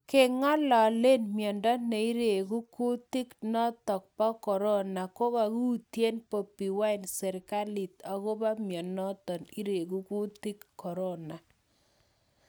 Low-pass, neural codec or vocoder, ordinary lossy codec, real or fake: none; none; none; real